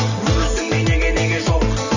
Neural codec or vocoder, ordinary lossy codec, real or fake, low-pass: none; none; real; 7.2 kHz